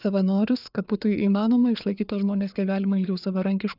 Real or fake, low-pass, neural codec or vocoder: fake; 5.4 kHz; codec, 16 kHz, 4 kbps, FreqCodec, larger model